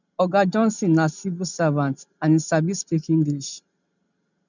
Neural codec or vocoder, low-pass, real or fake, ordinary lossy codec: none; 7.2 kHz; real; none